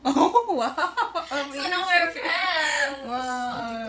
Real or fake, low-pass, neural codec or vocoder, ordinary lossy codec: fake; none; codec, 16 kHz, 16 kbps, FreqCodec, larger model; none